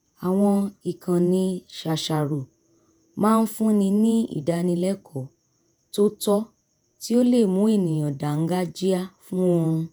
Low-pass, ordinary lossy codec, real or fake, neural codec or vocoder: none; none; fake; vocoder, 48 kHz, 128 mel bands, Vocos